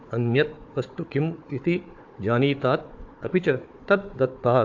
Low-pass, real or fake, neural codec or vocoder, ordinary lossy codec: 7.2 kHz; fake; codec, 16 kHz, 4 kbps, FunCodec, trained on Chinese and English, 50 frames a second; AAC, 48 kbps